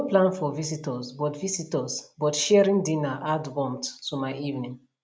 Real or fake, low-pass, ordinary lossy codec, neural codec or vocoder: real; none; none; none